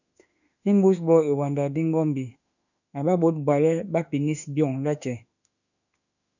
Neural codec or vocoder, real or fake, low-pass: autoencoder, 48 kHz, 32 numbers a frame, DAC-VAE, trained on Japanese speech; fake; 7.2 kHz